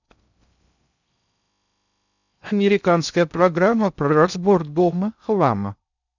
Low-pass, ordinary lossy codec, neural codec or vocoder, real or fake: 7.2 kHz; none; codec, 16 kHz in and 24 kHz out, 0.6 kbps, FocalCodec, streaming, 2048 codes; fake